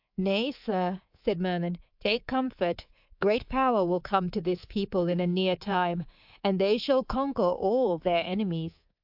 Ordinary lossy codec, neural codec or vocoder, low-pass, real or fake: AAC, 48 kbps; codec, 24 kHz, 3.1 kbps, DualCodec; 5.4 kHz; fake